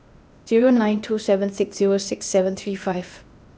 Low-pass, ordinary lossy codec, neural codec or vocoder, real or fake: none; none; codec, 16 kHz, 0.8 kbps, ZipCodec; fake